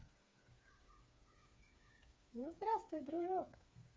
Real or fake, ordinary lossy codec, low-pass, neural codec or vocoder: fake; none; none; codec, 16 kHz, 4 kbps, FreqCodec, smaller model